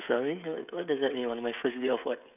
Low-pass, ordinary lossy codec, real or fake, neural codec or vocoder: 3.6 kHz; none; fake; codec, 16 kHz, 16 kbps, FreqCodec, smaller model